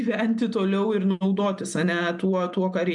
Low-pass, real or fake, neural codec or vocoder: 10.8 kHz; real; none